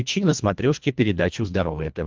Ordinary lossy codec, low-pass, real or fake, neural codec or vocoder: Opus, 32 kbps; 7.2 kHz; fake; codec, 24 kHz, 1.5 kbps, HILCodec